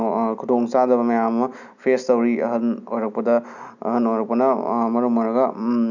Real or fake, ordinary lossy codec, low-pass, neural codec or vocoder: real; none; 7.2 kHz; none